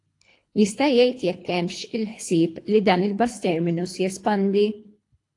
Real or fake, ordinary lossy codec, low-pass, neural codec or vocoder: fake; AAC, 48 kbps; 10.8 kHz; codec, 24 kHz, 3 kbps, HILCodec